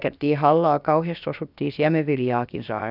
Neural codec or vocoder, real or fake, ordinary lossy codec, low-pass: codec, 16 kHz, about 1 kbps, DyCAST, with the encoder's durations; fake; none; 5.4 kHz